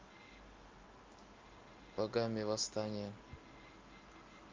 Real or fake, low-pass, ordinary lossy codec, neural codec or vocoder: real; 7.2 kHz; Opus, 24 kbps; none